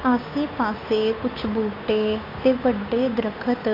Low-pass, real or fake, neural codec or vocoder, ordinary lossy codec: 5.4 kHz; fake; codec, 16 kHz, 8 kbps, FunCodec, trained on Chinese and English, 25 frames a second; AAC, 24 kbps